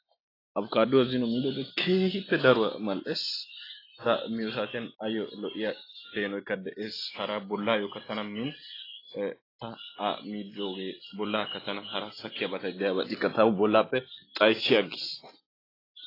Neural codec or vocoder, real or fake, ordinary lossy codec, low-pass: none; real; AAC, 24 kbps; 5.4 kHz